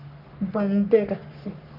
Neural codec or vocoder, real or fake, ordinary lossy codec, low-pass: codec, 44.1 kHz, 3.4 kbps, Pupu-Codec; fake; AAC, 48 kbps; 5.4 kHz